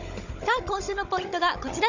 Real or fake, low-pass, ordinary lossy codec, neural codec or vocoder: fake; 7.2 kHz; none; codec, 16 kHz, 16 kbps, FunCodec, trained on Chinese and English, 50 frames a second